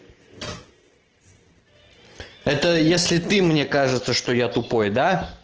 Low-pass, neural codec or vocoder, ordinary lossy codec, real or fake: 7.2 kHz; none; Opus, 16 kbps; real